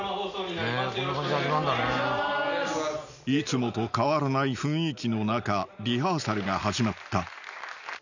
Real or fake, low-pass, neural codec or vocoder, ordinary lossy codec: real; 7.2 kHz; none; none